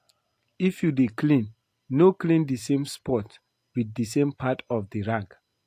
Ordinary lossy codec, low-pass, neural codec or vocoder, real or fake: AAC, 64 kbps; 14.4 kHz; none; real